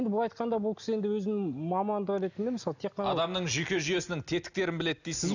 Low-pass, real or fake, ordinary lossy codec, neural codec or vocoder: 7.2 kHz; real; none; none